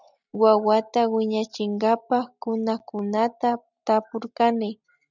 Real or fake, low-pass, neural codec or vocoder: real; 7.2 kHz; none